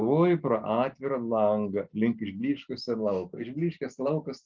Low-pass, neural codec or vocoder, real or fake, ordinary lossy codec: 7.2 kHz; none; real; Opus, 24 kbps